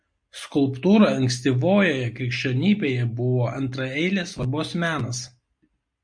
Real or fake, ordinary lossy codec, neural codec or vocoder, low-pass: real; MP3, 48 kbps; none; 9.9 kHz